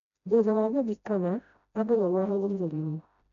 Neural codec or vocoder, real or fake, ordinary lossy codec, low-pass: codec, 16 kHz, 0.5 kbps, FreqCodec, smaller model; fake; none; 7.2 kHz